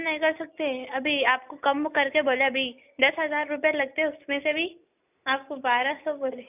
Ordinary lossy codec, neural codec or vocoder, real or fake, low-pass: none; none; real; 3.6 kHz